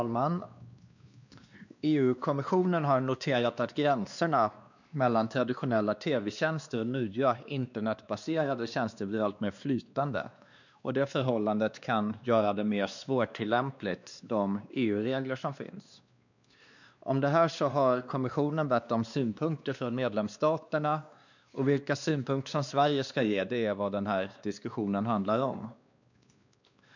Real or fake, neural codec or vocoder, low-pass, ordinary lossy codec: fake; codec, 16 kHz, 2 kbps, X-Codec, WavLM features, trained on Multilingual LibriSpeech; 7.2 kHz; none